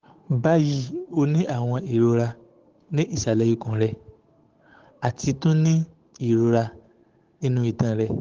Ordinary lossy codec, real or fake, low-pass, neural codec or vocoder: Opus, 16 kbps; fake; 7.2 kHz; codec, 16 kHz, 8 kbps, FunCodec, trained on Chinese and English, 25 frames a second